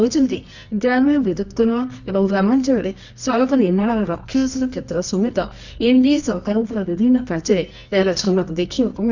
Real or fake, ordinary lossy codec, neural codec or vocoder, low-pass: fake; none; codec, 24 kHz, 0.9 kbps, WavTokenizer, medium music audio release; 7.2 kHz